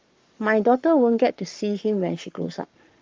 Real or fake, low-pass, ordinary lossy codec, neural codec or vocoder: fake; 7.2 kHz; Opus, 32 kbps; codec, 44.1 kHz, 7.8 kbps, Pupu-Codec